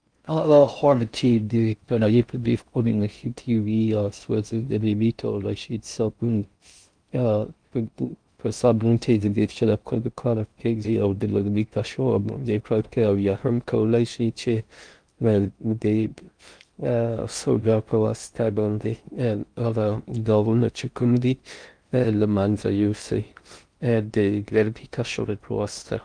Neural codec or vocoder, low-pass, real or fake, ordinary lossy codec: codec, 16 kHz in and 24 kHz out, 0.6 kbps, FocalCodec, streaming, 4096 codes; 9.9 kHz; fake; Opus, 32 kbps